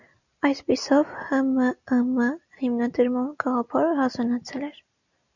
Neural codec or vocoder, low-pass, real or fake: none; 7.2 kHz; real